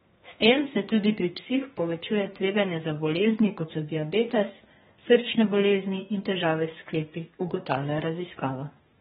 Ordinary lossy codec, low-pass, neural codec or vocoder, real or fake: AAC, 16 kbps; 14.4 kHz; codec, 32 kHz, 1.9 kbps, SNAC; fake